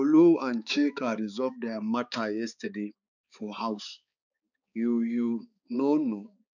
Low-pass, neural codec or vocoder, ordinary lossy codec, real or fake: 7.2 kHz; codec, 16 kHz, 4 kbps, X-Codec, HuBERT features, trained on balanced general audio; none; fake